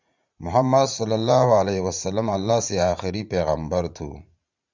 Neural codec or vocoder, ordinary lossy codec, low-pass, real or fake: vocoder, 44.1 kHz, 80 mel bands, Vocos; Opus, 64 kbps; 7.2 kHz; fake